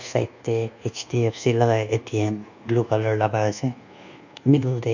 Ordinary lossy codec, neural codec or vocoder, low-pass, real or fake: none; codec, 24 kHz, 1.2 kbps, DualCodec; 7.2 kHz; fake